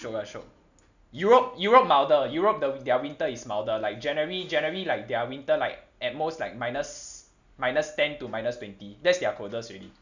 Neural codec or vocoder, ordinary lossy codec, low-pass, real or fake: none; none; 7.2 kHz; real